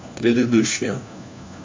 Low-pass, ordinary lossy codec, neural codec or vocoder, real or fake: 7.2 kHz; MP3, 64 kbps; codec, 16 kHz, 1 kbps, FunCodec, trained on LibriTTS, 50 frames a second; fake